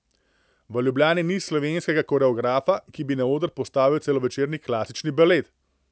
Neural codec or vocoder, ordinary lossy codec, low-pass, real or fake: none; none; none; real